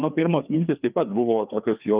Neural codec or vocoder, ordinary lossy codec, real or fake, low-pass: codec, 16 kHz, 2 kbps, FreqCodec, larger model; Opus, 24 kbps; fake; 3.6 kHz